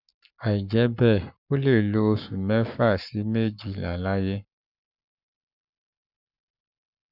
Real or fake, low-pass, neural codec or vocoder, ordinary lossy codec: fake; 5.4 kHz; codec, 44.1 kHz, 7.8 kbps, Pupu-Codec; none